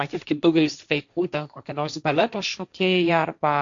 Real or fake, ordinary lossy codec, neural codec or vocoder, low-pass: fake; AAC, 64 kbps; codec, 16 kHz, 1.1 kbps, Voila-Tokenizer; 7.2 kHz